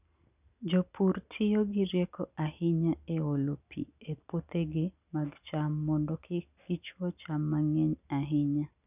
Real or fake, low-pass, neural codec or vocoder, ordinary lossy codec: real; 3.6 kHz; none; none